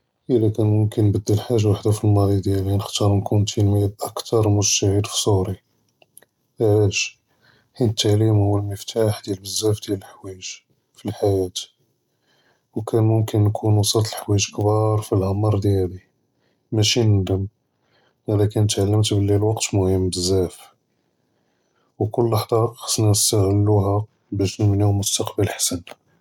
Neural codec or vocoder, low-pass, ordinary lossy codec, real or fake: none; 19.8 kHz; none; real